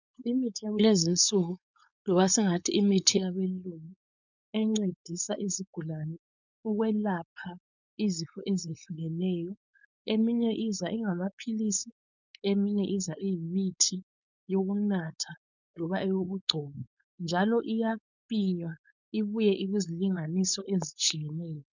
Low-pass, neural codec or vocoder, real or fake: 7.2 kHz; codec, 16 kHz, 4.8 kbps, FACodec; fake